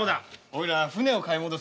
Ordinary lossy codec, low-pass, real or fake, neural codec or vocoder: none; none; real; none